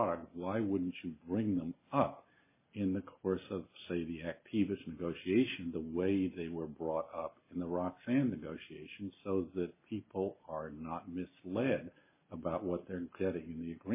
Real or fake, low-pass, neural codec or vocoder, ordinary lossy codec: real; 3.6 kHz; none; MP3, 16 kbps